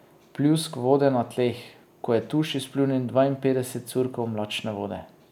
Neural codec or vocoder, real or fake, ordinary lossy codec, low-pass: none; real; none; 19.8 kHz